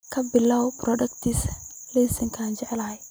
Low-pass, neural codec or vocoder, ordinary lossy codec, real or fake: none; none; none; real